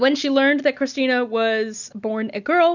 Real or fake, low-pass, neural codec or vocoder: real; 7.2 kHz; none